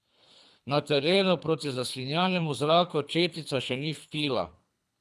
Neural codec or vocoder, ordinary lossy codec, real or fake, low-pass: codec, 24 kHz, 3 kbps, HILCodec; none; fake; none